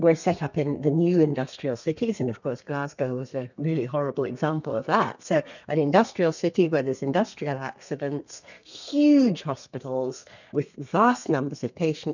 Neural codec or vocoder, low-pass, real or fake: codec, 32 kHz, 1.9 kbps, SNAC; 7.2 kHz; fake